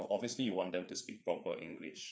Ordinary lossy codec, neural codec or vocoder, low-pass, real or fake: none; codec, 16 kHz, 4 kbps, FreqCodec, larger model; none; fake